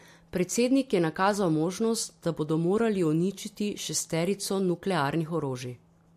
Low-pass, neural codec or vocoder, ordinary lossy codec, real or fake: 14.4 kHz; none; MP3, 64 kbps; real